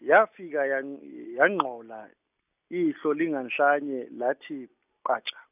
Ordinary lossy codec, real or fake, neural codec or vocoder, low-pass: none; real; none; 3.6 kHz